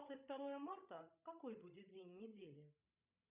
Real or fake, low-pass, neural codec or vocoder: fake; 3.6 kHz; codec, 16 kHz, 16 kbps, FreqCodec, larger model